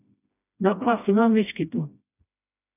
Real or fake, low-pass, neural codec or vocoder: fake; 3.6 kHz; codec, 16 kHz, 1 kbps, FreqCodec, smaller model